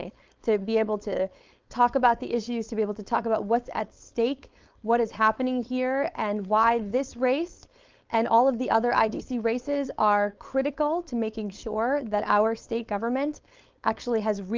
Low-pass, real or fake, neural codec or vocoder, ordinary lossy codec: 7.2 kHz; fake; codec, 16 kHz, 4.8 kbps, FACodec; Opus, 24 kbps